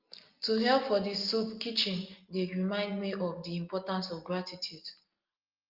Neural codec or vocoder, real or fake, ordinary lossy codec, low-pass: none; real; Opus, 32 kbps; 5.4 kHz